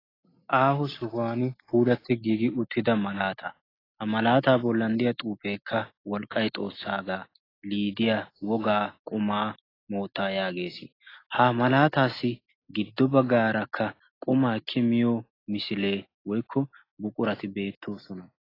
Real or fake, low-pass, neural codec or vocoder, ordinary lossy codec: real; 5.4 kHz; none; AAC, 24 kbps